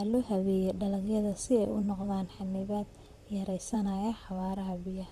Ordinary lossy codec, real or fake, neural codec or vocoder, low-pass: Opus, 64 kbps; fake; vocoder, 44.1 kHz, 128 mel bands, Pupu-Vocoder; 14.4 kHz